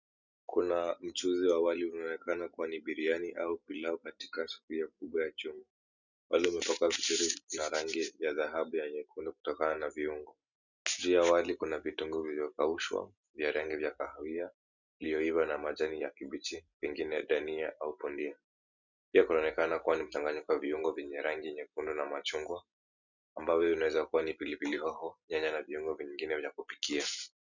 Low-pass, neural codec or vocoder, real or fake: 7.2 kHz; none; real